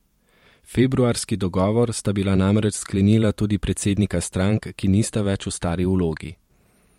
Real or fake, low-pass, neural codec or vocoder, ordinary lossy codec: fake; 19.8 kHz; vocoder, 44.1 kHz, 128 mel bands every 512 samples, BigVGAN v2; MP3, 64 kbps